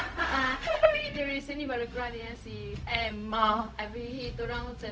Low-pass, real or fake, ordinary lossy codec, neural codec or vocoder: none; fake; none; codec, 16 kHz, 0.4 kbps, LongCat-Audio-Codec